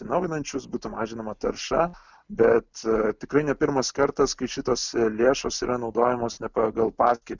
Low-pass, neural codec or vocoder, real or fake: 7.2 kHz; none; real